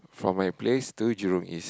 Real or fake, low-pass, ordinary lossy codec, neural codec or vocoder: real; none; none; none